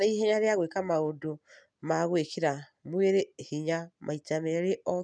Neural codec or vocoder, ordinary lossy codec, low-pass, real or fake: none; none; 9.9 kHz; real